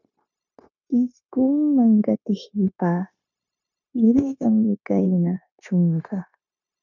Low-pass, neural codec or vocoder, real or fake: 7.2 kHz; codec, 16 kHz, 0.9 kbps, LongCat-Audio-Codec; fake